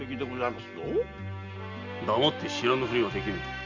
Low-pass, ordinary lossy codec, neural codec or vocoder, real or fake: 7.2 kHz; Opus, 64 kbps; none; real